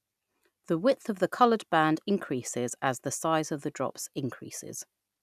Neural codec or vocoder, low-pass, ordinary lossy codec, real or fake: none; 14.4 kHz; none; real